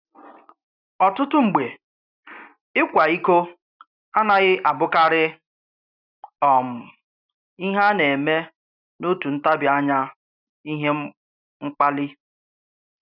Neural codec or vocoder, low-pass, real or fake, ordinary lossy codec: none; 5.4 kHz; real; none